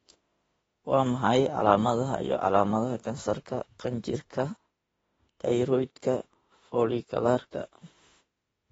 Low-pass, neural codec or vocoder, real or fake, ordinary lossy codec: 19.8 kHz; autoencoder, 48 kHz, 32 numbers a frame, DAC-VAE, trained on Japanese speech; fake; AAC, 24 kbps